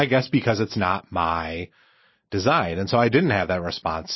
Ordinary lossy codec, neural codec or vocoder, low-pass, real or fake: MP3, 24 kbps; none; 7.2 kHz; real